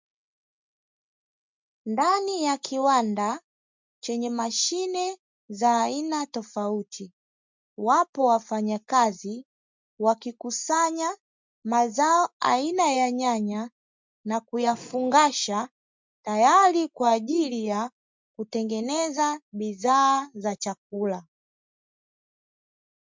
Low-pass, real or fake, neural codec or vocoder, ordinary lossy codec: 7.2 kHz; real; none; MP3, 64 kbps